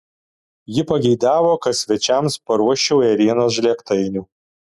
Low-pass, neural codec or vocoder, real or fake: 14.4 kHz; none; real